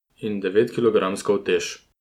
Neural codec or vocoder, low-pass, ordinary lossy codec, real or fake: none; 19.8 kHz; none; real